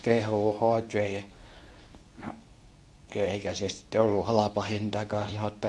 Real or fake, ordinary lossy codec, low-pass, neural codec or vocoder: fake; none; none; codec, 24 kHz, 0.9 kbps, WavTokenizer, medium speech release version 2